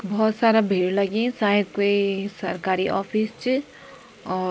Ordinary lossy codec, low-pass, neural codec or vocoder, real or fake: none; none; none; real